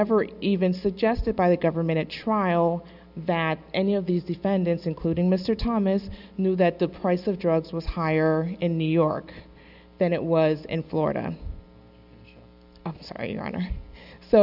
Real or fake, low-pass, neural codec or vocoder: real; 5.4 kHz; none